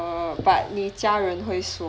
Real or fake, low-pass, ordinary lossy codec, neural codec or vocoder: real; none; none; none